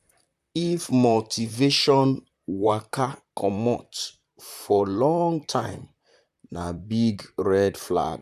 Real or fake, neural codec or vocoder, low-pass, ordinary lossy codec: fake; vocoder, 44.1 kHz, 128 mel bands, Pupu-Vocoder; 14.4 kHz; none